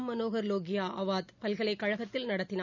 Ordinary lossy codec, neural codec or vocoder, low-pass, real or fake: none; none; 7.2 kHz; real